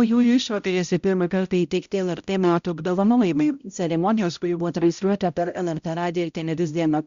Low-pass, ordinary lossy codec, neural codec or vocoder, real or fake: 7.2 kHz; Opus, 64 kbps; codec, 16 kHz, 0.5 kbps, X-Codec, HuBERT features, trained on balanced general audio; fake